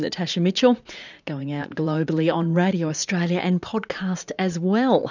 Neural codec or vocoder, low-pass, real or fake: vocoder, 44.1 kHz, 80 mel bands, Vocos; 7.2 kHz; fake